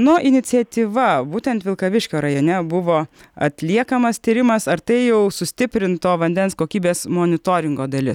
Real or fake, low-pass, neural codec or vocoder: real; 19.8 kHz; none